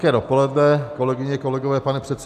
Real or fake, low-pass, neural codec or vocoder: real; 14.4 kHz; none